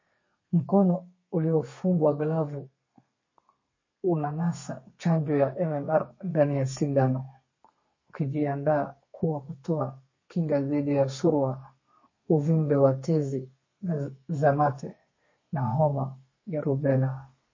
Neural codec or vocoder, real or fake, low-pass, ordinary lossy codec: codec, 32 kHz, 1.9 kbps, SNAC; fake; 7.2 kHz; MP3, 32 kbps